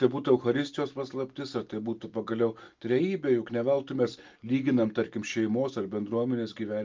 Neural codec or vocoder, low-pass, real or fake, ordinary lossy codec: none; 7.2 kHz; real; Opus, 32 kbps